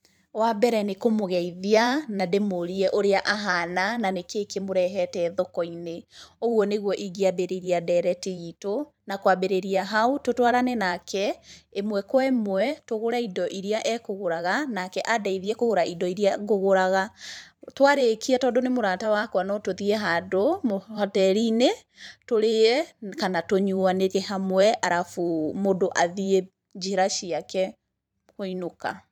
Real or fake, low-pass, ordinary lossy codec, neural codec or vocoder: fake; 19.8 kHz; none; vocoder, 44.1 kHz, 128 mel bands every 512 samples, BigVGAN v2